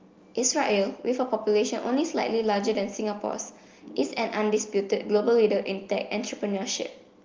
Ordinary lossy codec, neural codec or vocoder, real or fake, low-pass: Opus, 32 kbps; none; real; 7.2 kHz